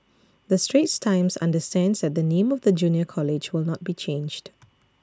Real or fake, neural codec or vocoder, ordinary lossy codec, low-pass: real; none; none; none